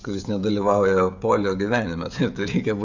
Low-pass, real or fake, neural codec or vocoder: 7.2 kHz; fake; codec, 16 kHz, 16 kbps, FreqCodec, smaller model